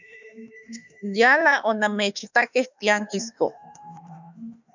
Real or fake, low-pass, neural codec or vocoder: fake; 7.2 kHz; autoencoder, 48 kHz, 32 numbers a frame, DAC-VAE, trained on Japanese speech